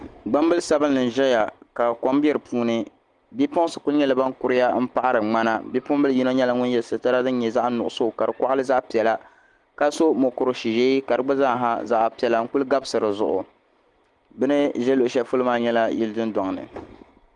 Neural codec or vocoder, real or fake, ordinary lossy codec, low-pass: none; real; Opus, 24 kbps; 10.8 kHz